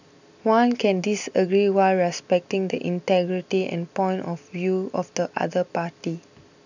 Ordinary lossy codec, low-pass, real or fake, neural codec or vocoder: none; 7.2 kHz; real; none